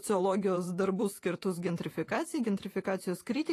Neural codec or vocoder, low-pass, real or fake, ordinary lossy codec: vocoder, 48 kHz, 128 mel bands, Vocos; 14.4 kHz; fake; AAC, 48 kbps